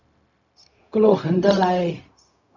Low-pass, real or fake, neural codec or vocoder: 7.2 kHz; fake; codec, 16 kHz, 0.4 kbps, LongCat-Audio-Codec